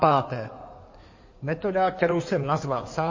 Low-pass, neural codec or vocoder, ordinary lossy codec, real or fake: 7.2 kHz; codec, 16 kHz in and 24 kHz out, 2.2 kbps, FireRedTTS-2 codec; MP3, 32 kbps; fake